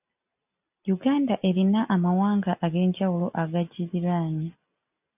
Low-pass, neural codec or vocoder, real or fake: 3.6 kHz; none; real